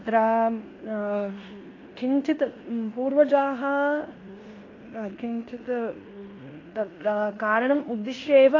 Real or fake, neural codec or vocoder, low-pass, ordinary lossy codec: fake; codec, 16 kHz in and 24 kHz out, 0.9 kbps, LongCat-Audio-Codec, fine tuned four codebook decoder; 7.2 kHz; AAC, 32 kbps